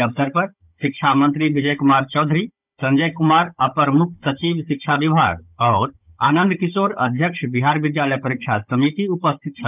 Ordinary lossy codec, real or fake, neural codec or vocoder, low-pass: none; fake; codec, 16 kHz, 16 kbps, FunCodec, trained on Chinese and English, 50 frames a second; 3.6 kHz